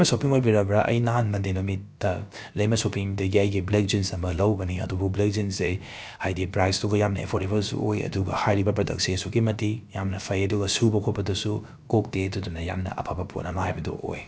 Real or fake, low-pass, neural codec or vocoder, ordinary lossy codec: fake; none; codec, 16 kHz, about 1 kbps, DyCAST, with the encoder's durations; none